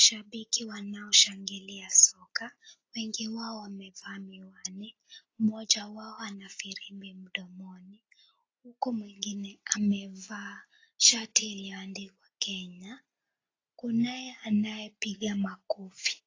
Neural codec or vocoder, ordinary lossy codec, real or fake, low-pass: none; AAC, 32 kbps; real; 7.2 kHz